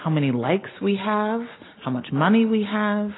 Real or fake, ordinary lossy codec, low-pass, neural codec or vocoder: real; AAC, 16 kbps; 7.2 kHz; none